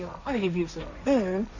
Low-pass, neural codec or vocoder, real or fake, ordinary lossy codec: 7.2 kHz; codec, 16 kHz, 1.1 kbps, Voila-Tokenizer; fake; none